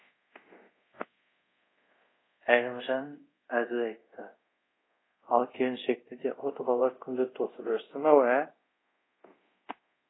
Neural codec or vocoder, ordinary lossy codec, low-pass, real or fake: codec, 24 kHz, 0.5 kbps, DualCodec; AAC, 16 kbps; 7.2 kHz; fake